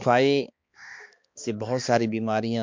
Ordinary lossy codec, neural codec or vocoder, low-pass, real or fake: MP3, 48 kbps; codec, 16 kHz, 2 kbps, X-Codec, HuBERT features, trained on balanced general audio; 7.2 kHz; fake